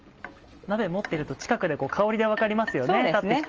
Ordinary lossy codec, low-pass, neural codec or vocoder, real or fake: Opus, 24 kbps; 7.2 kHz; none; real